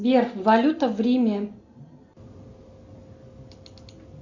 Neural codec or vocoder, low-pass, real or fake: none; 7.2 kHz; real